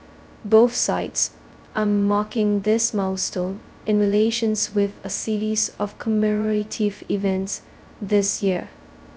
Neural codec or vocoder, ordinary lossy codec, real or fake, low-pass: codec, 16 kHz, 0.2 kbps, FocalCodec; none; fake; none